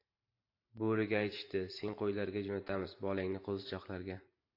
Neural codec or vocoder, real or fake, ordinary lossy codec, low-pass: vocoder, 44.1 kHz, 128 mel bands every 512 samples, BigVGAN v2; fake; AAC, 32 kbps; 5.4 kHz